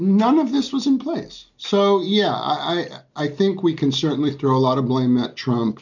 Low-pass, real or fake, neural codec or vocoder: 7.2 kHz; real; none